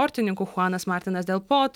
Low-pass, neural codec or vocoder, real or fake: 19.8 kHz; none; real